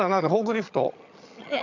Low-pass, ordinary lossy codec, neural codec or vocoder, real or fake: 7.2 kHz; AAC, 48 kbps; vocoder, 22.05 kHz, 80 mel bands, HiFi-GAN; fake